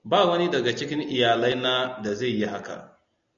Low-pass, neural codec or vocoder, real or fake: 7.2 kHz; none; real